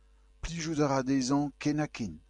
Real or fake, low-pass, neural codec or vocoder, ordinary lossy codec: real; 10.8 kHz; none; MP3, 96 kbps